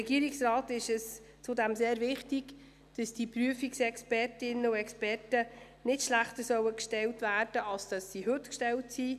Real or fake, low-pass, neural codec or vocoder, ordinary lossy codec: real; 14.4 kHz; none; none